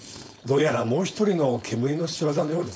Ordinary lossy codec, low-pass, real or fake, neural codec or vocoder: none; none; fake; codec, 16 kHz, 4.8 kbps, FACodec